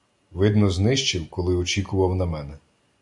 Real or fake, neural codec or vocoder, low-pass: real; none; 10.8 kHz